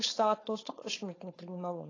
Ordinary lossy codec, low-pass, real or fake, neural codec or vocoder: AAC, 32 kbps; 7.2 kHz; fake; codec, 16 kHz, 4.8 kbps, FACodec